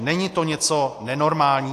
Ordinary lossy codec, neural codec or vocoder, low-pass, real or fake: AAC, 64 kbps; none; 14.4 kHz; real